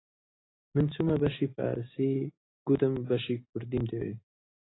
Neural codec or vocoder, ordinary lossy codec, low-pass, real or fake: none; AAC, 16 kbps; 7.2 kHz; real